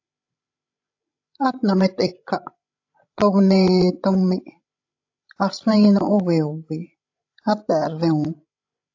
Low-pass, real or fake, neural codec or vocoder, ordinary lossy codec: 7.2 kHz; fake; codec, 16 kHz, 16 kbps, FreqCodec, larger model; AAC, 48 kbps